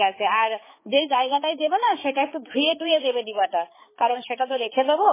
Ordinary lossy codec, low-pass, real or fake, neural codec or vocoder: MP3, 16 kbps; 3.6 kHz; fake; codec, 16 kHz, 4 kbps, X-Codec, HuBERT features, trained on balanced general audio